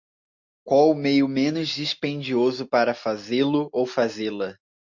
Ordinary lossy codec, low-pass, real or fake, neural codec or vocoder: MP3, 48 kbps; 7.2 kHz; real; none